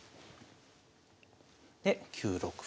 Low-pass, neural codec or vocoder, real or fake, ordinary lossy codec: none; none; real; none